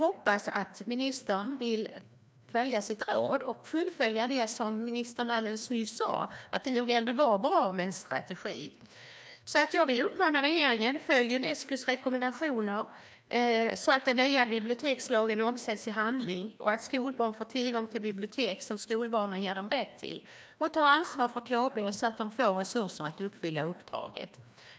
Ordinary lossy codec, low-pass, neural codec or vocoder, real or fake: none; none; codec, 16 kHz, 1 kbps, FreqCodec, larger model; fake